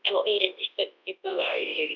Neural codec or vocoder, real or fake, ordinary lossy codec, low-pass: codec, 24 kHz, 0.9 kbps, WavTokenizer, large speech release; fake; none; 7.2 kHz